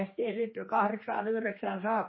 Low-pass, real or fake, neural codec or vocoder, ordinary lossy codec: 7.2 kHz; fake; codec, 16 kHz, 2 kbps, X-Codec, HuBERT features, trained on general audio; MP3, 24 kbps